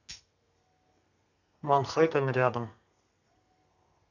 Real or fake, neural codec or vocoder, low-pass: fake; codec, 32 kHz, 1.9 kbps, SNAC; 7.2 kHz